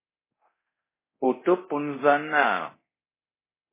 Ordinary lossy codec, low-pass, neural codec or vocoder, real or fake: MP3, 16 kbps; 3.6 kHz; codec, 24 kHz, 0.9 kbps, DualCodec; fake